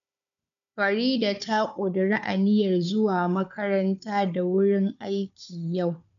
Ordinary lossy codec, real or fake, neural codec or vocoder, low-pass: none; fake; codec, 16 kHz, 4 kbps, FunCodec, trained on Chinese and English, 50 frames a second; 7.2 kHz